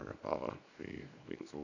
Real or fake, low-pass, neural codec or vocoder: fake; 7.2 kHz; codec, 24 kHz, 0.9 kbps, WavTokenizer, small release